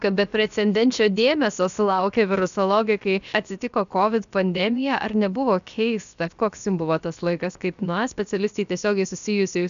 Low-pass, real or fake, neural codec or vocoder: 7.2 kHz; fake; codec, 16 kHz, about 1 kbps, DyCAST, with the encoder's durations